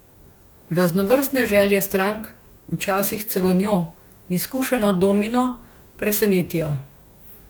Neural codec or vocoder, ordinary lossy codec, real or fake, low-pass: codec, 44.1 kHz, 2.6 kbps, DAC; none; fake; none